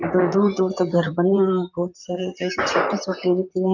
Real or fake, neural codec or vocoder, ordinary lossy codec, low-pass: fake; vocoder, 44.1 kHz, 128 mel bands, Pupu-Vocoder; none; 7.2 kHz